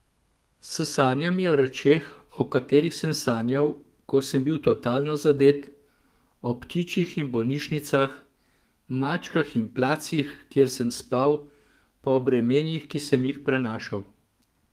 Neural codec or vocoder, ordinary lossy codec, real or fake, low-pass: codec, 32 kHz, 1.9 kbps, SNAC; Opus, 32 kbps; fake; 14.4 kHz